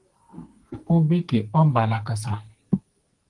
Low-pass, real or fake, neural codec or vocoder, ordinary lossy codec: 10.8 kHz; fake; codec, 44.1 kHz, 2.6 kbps, SNAC; Opus, 24 kbps